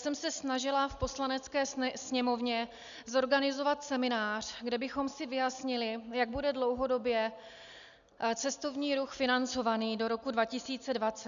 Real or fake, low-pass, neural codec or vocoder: real; 7.2 kHz; none